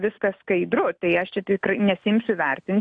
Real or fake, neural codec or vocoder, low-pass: real; none; 9.9 kHz